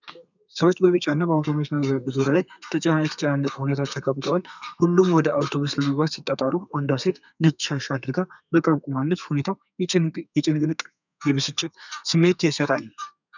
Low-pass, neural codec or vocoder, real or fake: 7.2 kHz; codec, 32 kHz, 1.9 kbps, SNAC; fake